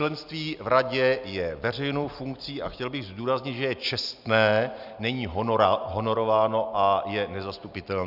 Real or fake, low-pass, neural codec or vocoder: real; 5.4 kHz; none